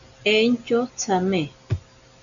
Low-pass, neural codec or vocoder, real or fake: 7.2 kHz; none; real